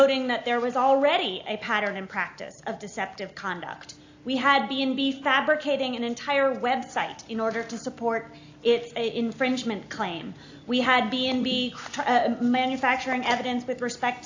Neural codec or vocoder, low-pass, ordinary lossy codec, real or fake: none; 7.2 kHz; AAC, 48 kbps; real